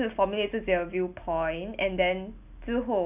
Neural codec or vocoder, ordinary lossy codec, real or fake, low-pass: none; none; real; 3.6 kHz